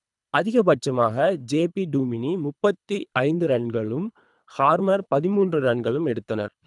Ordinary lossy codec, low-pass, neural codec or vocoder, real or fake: none; 10.8 kHz; codec, 24 kHz, 3 kbps, HILCodec; fake